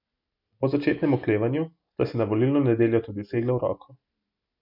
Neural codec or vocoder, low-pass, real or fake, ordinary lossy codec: none; 5.4 kHz; real; MP3, 48 kbps